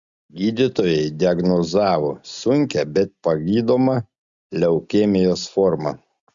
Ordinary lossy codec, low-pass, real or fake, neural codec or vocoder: Opus, 64 kbps; 7.2 kHz; real; none